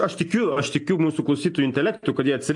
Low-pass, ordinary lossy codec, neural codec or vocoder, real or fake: 10.8 kHz; AAC, 64 kbps; none; real